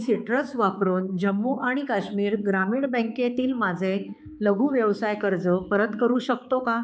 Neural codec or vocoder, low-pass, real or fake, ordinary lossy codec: codec, 16 kHz, 4 kbps, X-Codec, HuBERT features, trained on balanced general audio; none; fake; none